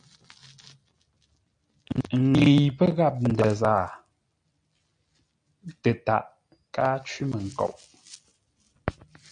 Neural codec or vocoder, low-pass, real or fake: none; 9.9 kHz; real